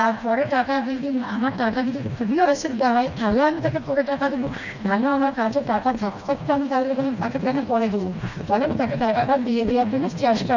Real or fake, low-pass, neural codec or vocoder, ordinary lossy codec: fake; 7.2 kHz; codec, 16 kHz, 1 kbps, FreqCodec, smaller model; none